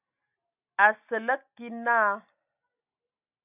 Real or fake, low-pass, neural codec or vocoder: real; 3.6 kHz; none